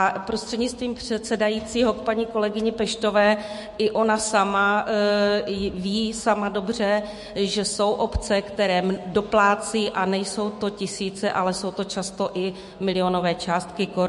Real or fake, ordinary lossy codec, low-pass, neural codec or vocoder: fake; MP3, 48 kbps; 14.4 kHz; autoencoder, 48 kHz, 128 numbers a frame, DAC-VAE, trained on Japanese speech